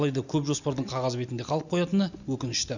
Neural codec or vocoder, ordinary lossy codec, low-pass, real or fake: none; none; 7.2 kHz; real